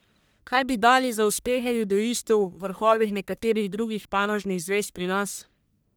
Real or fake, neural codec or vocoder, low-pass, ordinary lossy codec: fake; codec, 44.1 kHz, 1.7 kbps, Pupu-Codec; none; none